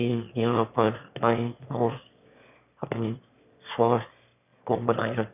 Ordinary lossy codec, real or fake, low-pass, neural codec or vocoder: none; fake; 3.6 kHz; autoencoder, 22.05 kHz, a latent of 192 numbers a frame, VITS, trained on one speaker